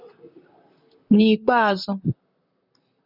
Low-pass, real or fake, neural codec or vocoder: 5.4 kHz; fake; vocoder, 44.1 kHz, 128 mel bands, Pupu-Vocoder